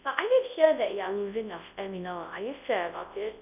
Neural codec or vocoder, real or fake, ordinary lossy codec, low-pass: codec, 24 kHz, 0.9 kbps, WavTokenizer, large speech release; fake; none; 3.6 kHz